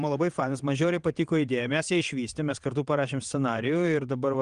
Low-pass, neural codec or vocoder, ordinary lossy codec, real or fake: 9.9 kHz; vocoder, 22.05 kHz, 80 mel bands, WaveNeXt; Opus, 24 kbps; fake